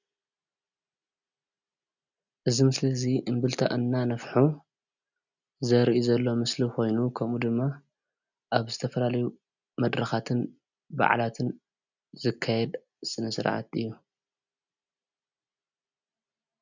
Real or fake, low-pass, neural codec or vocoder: real; 7.2 kHz; none